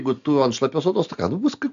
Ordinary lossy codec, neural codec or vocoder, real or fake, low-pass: AAC, 64 kbps; none; real; 7.2 kHz